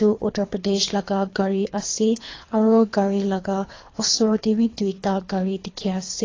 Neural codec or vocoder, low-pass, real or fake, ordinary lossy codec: codec, 24 kHz, 3 kbps, HILCodec; 7.2 kHz; fake; AAC, 32 kbps